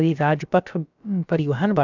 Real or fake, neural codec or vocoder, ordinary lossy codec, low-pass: fake; codec, 16 kHz, about 1 kbps, DyCAST, with the encoder's durations; none; 7.2 kHz